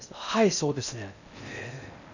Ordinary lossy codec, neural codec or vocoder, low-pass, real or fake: none; codec, 16 kHz in and 24 kHz out, 0.6 kbps, FocalCodec, streaming, 4096 codes; 7.2 kHz; fake